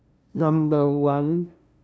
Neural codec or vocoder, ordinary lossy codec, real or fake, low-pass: codec, 16 kHz, 0.5 kbps, FunCodec, trained on LibriTTS, 25 frames a second; none; fake; none